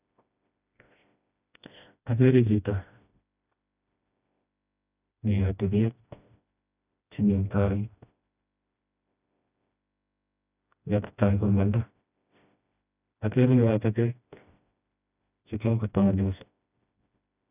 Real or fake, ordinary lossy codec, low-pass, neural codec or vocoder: fake; none; 3.6 kHz; codec, 16 kHz, 1 kbps, FreqCodec, smaller model